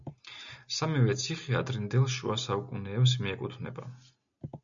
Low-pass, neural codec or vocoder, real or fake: 7.2 kHz; none; real